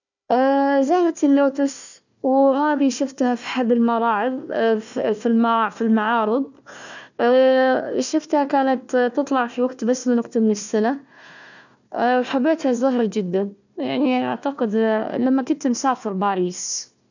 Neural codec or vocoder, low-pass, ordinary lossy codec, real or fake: codec, 16 kHz, 1 kbps, FunCodec, trained on Chinese and English, 50 frames a second; 7.2 kHz; none; fake